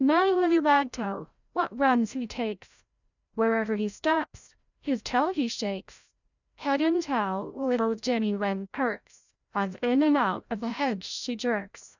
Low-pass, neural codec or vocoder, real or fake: 7.2 kHz; codec, 16 kHz, 0.5 kbps, FreqCodec, larger model; fake